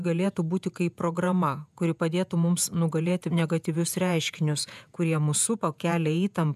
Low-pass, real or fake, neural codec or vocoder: 14.4 kHz; fake; vocoder, 48 kHz, 128 mel bands, Vocos